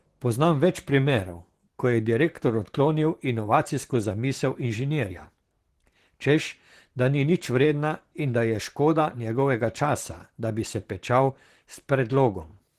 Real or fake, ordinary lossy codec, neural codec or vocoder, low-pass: fake; Opus, 16 kbps; vocoder, 44.1 kHz, 128 mel bands, Pupu-Vocoder; 14.4 kHz